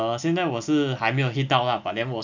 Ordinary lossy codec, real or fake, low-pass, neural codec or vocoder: none; real; 7.2 kHz; none